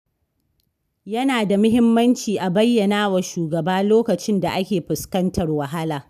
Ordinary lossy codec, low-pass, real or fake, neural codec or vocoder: none; 14.4 kHz; real; none